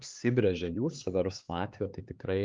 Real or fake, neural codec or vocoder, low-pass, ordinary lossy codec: fake; codec, 16 kHz, 4 kbps, X-Codec, HuBERT features, trained on LibriSpeech; 7.2 kHz; Opus, 32 kbps